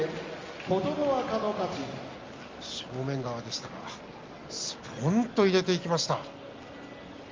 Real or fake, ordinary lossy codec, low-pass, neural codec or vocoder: real; Opus, 32 kbps; 7.2 kHz; none